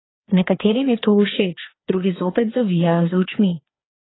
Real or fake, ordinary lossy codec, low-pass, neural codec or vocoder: fake; AAC, 16 kbps; 7.2 kHz; codec, 16 kHz, 2 kbps, FreqCodec, larger model